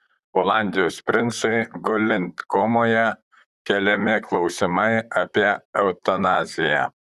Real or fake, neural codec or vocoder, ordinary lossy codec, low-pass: fake; vocoder, 44.1 kHz, 128 mel bands, Pupu-Vocoder; Opus, 64 kbps; 14.4 kHz